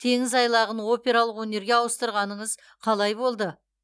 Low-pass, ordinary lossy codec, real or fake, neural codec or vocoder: none; none; real; none